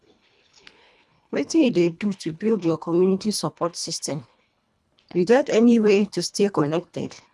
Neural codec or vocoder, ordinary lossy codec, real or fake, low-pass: codec, 24 kHz, 1.5 kbps, HILCodec; none; fake; none